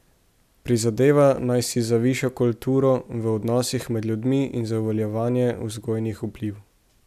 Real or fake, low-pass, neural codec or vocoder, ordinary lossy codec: real; 14.4 kHz; none; none